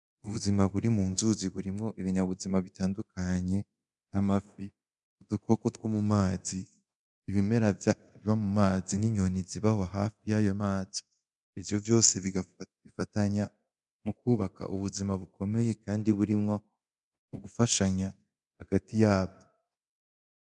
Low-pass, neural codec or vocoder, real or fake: 10.8 kHz; codec, 24 kHz, 0.9 kbps, DualCodec; fake